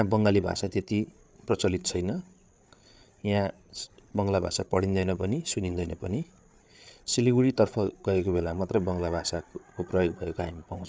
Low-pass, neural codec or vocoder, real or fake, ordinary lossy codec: none; codec, 16 kHz, 8 kbps, FreqCodec, larger model; fake; none